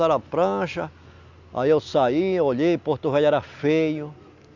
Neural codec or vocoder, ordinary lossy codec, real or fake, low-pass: none; none; real; 7.2 kHz